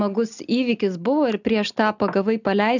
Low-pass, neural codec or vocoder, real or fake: 7.2 kHz; none; real